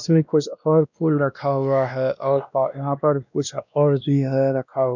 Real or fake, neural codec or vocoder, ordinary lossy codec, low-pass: fake; codec, 16 kHz, 1 kbps, X-Codec, WavLM features, trained on Multilingual LibriSpeech; none; 7.2 kHz